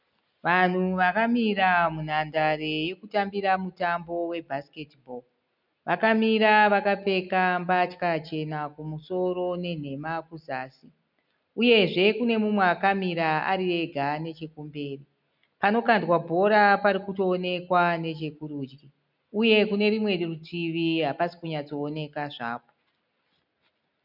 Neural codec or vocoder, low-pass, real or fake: none; 5.4 kHz; real